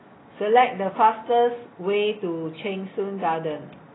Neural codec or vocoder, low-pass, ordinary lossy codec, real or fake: none; 7.2 kHz; AAC, 16 kbps; real